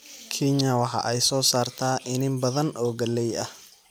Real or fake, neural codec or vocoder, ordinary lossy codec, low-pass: fake; vocoder, 44.1 kHz, 128 mel bands every 256 samples, BigVGAN v2; none; none